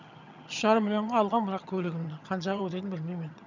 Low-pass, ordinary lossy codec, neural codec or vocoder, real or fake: 7.2 kHz; none; vocoder, 22.05 kHz, 80 mel bands, HiFi-GAN; fake